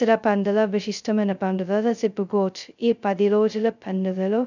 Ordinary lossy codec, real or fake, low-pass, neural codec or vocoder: none; fake; 7.2 kHz; codec, 16 kHz, 0.2 kbps, FocalCodec